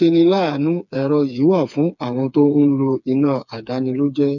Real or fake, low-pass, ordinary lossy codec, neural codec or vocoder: fake; 7.2 kHz; none; codec, 16 kHz, 4 kbps, FreqCodec, smaller model